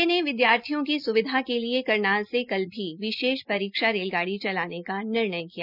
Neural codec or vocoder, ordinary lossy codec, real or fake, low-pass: none; none; real; 5.4 kHz